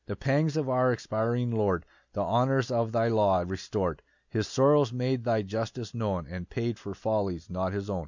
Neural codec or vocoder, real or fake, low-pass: none; real; 7.2 kHz